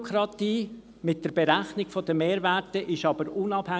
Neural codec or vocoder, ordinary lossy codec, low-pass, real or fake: none; none; none; real